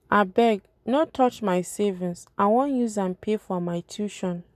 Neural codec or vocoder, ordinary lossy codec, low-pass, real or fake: none; none; 14.4 kHz; real